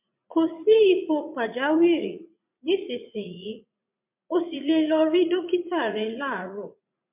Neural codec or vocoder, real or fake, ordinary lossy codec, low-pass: vocoder, 44.1 kHz, 80 mel bands, Vocos; fake; MP3, 32 kbps; 3.6 kHz